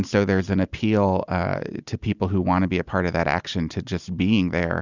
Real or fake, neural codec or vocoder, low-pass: real; none; 7.2 kHz